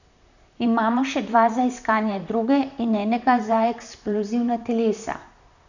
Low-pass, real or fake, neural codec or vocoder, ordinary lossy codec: 7.2 kHz; fake; vocoder, 44.1 kHz, 128 mel bands, Pupu-Vocoder; none